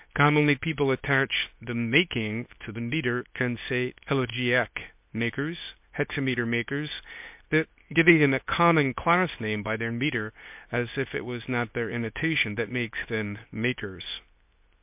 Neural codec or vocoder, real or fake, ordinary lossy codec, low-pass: codec, 24 kHz, 0.9 kbps, WavTokenizer, medium speech release version 2; fake; MP3, 32 kbps; 3.6 kHz